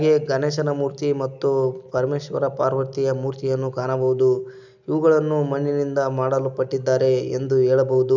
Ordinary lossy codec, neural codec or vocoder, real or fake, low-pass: none; none; real; 7.2 kHz